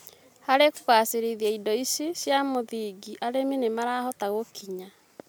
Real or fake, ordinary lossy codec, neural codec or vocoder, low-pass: real; none; none; none